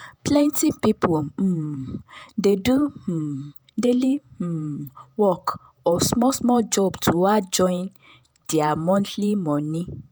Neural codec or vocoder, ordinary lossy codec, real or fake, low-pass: vocoder, 48 kHz, 128 mel bands, Vocos; none; fake; none